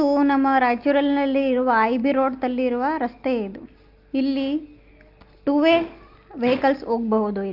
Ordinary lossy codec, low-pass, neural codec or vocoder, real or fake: Opus, 32 kbps; 5.4 kHz; none; real